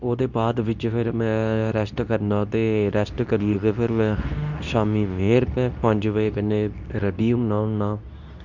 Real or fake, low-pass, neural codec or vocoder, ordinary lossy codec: fake; 7.2 kHz; codec, 24 kHz, 0.9 kbps, WavTokenizer, medium speech release version 2; none